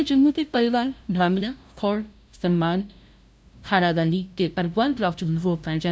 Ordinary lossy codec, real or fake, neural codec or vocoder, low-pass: none; fake; codec, 16 kHz, 0.5 kbps, FunCodec, trained on LibriTTS, 25 frames a second; none